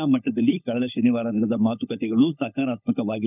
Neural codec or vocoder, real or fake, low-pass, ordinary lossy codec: codec, 16 kHz, 16 kbps, FunCodec, trained on LibriTTS, 50 frames a second; fake; 3.6 kHz; none